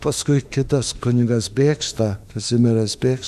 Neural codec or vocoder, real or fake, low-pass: autoencoder, 48 kHz, 32 numbers a frame, DAC-VAE, trained on Japanese speech; fake; 14.4 kHz